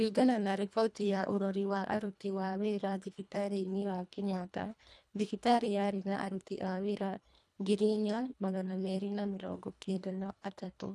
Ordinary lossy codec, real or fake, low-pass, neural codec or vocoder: none; fake; none; codec, 24 kHz, 1.5 kbps, HILCodec